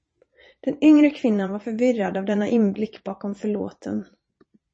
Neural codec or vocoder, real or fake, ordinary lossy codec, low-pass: vocoder, 22.05 kHz, 80 mel bands, Vocos; fake; MP3, 32 kbps; 9.9 kHz